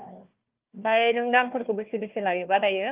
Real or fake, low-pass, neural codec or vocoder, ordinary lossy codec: fake; 3.6 kHz; codec, 16 kHz, 1 kbps, FunCodec, trained on Chinese and English, 50 frames a second; Opus, 24 kbps